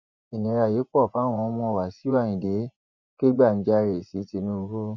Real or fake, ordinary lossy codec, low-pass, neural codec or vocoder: fake; none; 7.2 kHz; vocoder, 24 kHz, 100 mel bands, Vocos